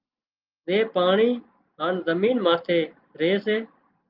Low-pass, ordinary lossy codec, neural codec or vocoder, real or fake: 5.4 kHz; Opus, 24 kbps; none; real